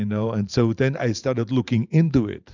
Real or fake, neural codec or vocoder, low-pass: fake; vocoder, 44.1 kHz, 128 mel bands every 512 samples, BigVGAN v2; 7.2 kHz